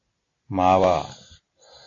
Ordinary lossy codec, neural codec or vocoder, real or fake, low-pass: AAC, 32 kbps; none; real; 7.2 kHz